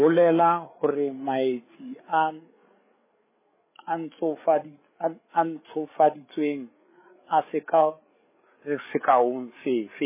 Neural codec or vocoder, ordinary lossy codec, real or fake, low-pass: codec, 16 kHz, 8 kbps, FreqCodec, larger model; MP3, 16 kbps; fake; 3.6 kHz